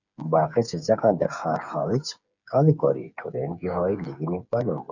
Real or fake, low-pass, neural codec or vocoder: fake; 7.2 kHz; codec, 16 kHz, 8 kbps, FreqCodec, smaller model